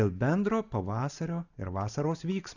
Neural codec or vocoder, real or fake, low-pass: none; real; 7.2 kHz